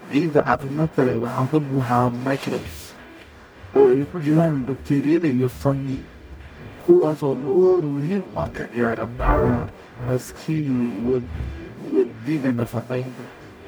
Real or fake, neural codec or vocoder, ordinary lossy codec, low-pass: fake; codec, 44.1 kHz, 0.9 kbps, DAC; none; none